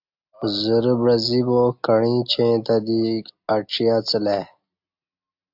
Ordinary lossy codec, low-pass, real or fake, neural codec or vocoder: AAC, 48 kbps; 5.4 kHz; real; none